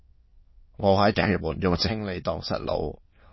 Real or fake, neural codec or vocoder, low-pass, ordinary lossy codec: fake; autoencoder, 22.05 kHz, a latent of 192 numbers a frame, VITS, trained on many speakers; 7.2 kHz; MP3, 24 kbps